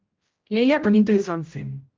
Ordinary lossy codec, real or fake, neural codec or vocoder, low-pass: Opus, 32 kbps; fake; codec, 16 kHz, 0.5 kbps, X-Codec, HuBERT features, trained on general audio; 7.2 kHz